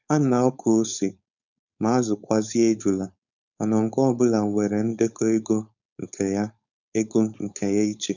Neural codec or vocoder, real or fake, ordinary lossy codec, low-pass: codec, 16 kHz, 4.8 kbps, FACodec; fake; none; 7.2 kHz